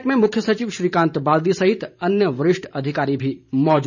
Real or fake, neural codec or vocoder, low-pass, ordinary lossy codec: fake; vocoder, 44.1 kHz, 128 mel bands every 512 samples, BigVGAN v2; 7.2 kHz; none